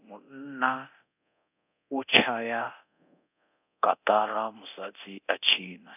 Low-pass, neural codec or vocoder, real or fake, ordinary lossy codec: 3.6 kHz; codec, 24 kHz, 0.9 kbps, DualCodec; fake; none